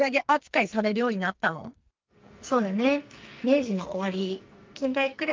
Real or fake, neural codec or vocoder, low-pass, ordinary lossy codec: fake; codec, 44.1 kHz, 2.6 kbps, SNAC; 7.2 kHz; Opus, 24 kbps